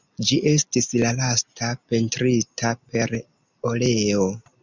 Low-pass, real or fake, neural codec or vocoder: 7.2 kHz; real; none